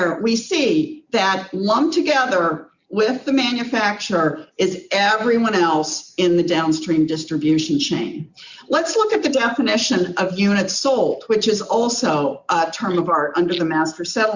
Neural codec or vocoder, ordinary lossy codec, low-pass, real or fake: none; Opus, 64 kbps; 7.2 kHz; real